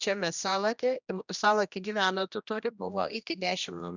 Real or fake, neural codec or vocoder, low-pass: fake; codec, 16 kHz, 1 kbps, X-Codec, HuBERT features, trained on general audio; 7.2 kHz